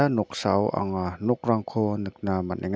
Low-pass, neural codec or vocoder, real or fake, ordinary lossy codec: none; none; real; none